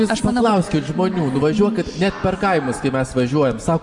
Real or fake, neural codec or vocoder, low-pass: real; none; 10.8 kHz